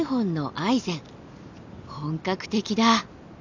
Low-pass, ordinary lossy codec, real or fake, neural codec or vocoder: 7.2 kHz; none; real; none